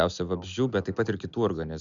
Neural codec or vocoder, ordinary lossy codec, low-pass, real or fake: none; MP3, 64 kbps; 7.2 kHz; real